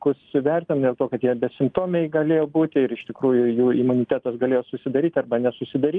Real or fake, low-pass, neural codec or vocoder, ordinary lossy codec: real; 9.9 kHz; none; Opus, 32 kbps